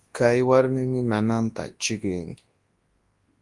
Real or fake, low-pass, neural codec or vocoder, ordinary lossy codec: fake; 10.8 kHz; codec, 24 kHz, 0.9 kbps, WavTokenizer, large speech release; Opus, 24 kbps